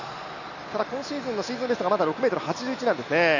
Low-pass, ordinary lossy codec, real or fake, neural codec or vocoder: 7.2 kHz; none; real; none